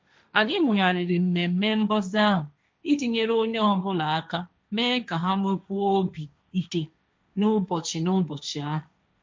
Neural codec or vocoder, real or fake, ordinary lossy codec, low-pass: codec, 16 kHz, 1.1 kbps, Voila-Tokenizer; fake; none; none